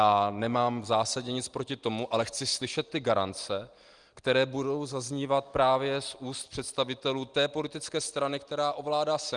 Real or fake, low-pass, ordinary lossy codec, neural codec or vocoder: real; 9.9 kHz; Opus, 24 kbps; none